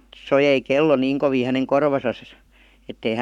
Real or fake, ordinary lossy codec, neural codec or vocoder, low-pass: fake; none; codec, 44.1 kHz, 7.8 kbps, Pupu-Codec; 19.8 kHz